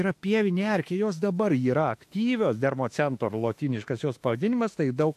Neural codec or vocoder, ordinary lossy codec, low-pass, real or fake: autoencoder, 48 kHz, 32 numbers a frame, DAC-VAE, trained on Japanese speech; AAC, 64 kbps; 14.4 kHz; fake